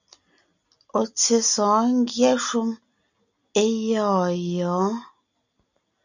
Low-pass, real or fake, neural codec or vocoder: 7.2 kHz; real; none